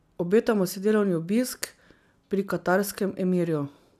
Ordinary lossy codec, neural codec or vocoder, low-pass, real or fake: none; none; 14.4 kHz; real